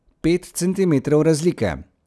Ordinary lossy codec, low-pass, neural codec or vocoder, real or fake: none; none; none; real